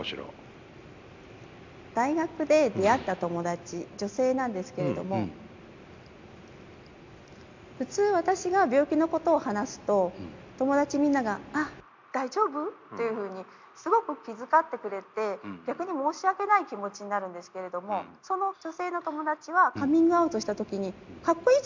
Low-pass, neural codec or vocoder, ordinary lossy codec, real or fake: 7.2 kHz; none; MP3, 64 kbps; real